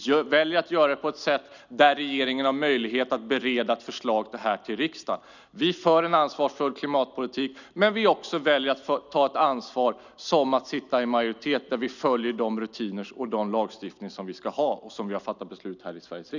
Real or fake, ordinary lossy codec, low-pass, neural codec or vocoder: real; none; 7.2 kHz; none